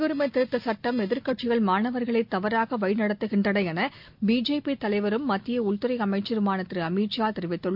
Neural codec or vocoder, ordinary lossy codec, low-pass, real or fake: none; MP3, 48 kbps; 5.4 kHz; real